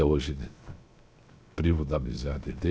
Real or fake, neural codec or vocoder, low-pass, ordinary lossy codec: fake; codec, 16 kHz, 0.7 kbps, FocalCodec; none; none